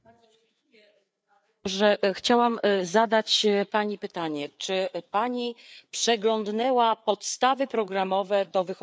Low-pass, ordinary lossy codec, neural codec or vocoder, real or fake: none; none; codec, 16 kHz, 4 kbps, FreqCodec, larger model; fake